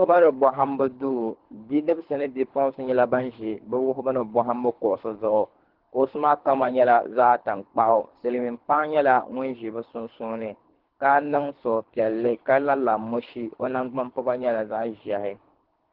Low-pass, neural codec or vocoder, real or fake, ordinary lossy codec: 5.4 kHz; codec, 24 kHz, 3 kbps, HILCodec; fake; Opus, 16 kbps